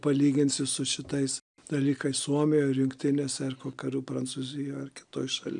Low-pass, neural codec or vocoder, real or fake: 9.9 kHz; none; real